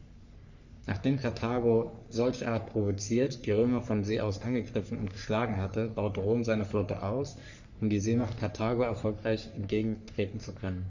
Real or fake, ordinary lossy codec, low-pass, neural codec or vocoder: fake; none; 7.2 kHz; codec, 44.1 kHz, 3.4 kbps, Pupu-Codec